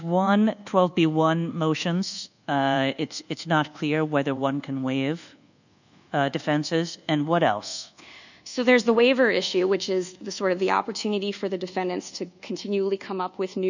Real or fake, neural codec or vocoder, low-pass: fake; codec, 24 kHz, 1.2 kbps, DualCodec; 7.2 kHz